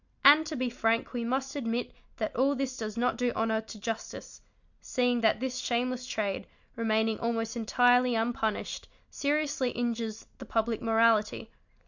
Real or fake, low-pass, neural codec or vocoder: real; 7.2 kHz; none